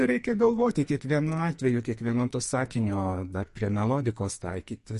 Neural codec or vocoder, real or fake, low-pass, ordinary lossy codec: codec, 44.1 kHz, 2.6 kbps, SNAC; fake; 14.4 kHz; MP3, 48 kbps